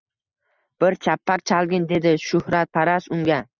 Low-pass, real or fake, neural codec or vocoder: 7.2 kHz; real; none